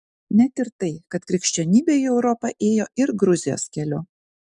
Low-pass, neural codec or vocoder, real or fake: 10.8 kHz; none; real